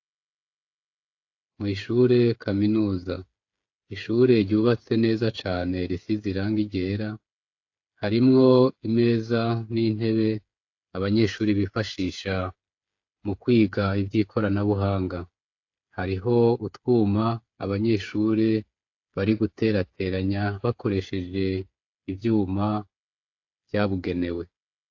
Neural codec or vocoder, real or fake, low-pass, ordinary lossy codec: codec, 16 kHz, 8 kbps, FreqCodec, smaller model; fake; 7.2 kHz; AAC, 48 kbps